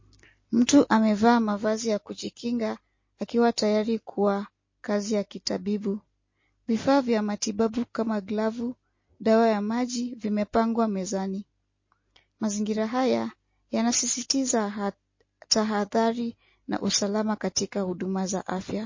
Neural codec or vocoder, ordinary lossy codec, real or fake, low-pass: none; MP3, 32 kbps; real; 7.2 kHz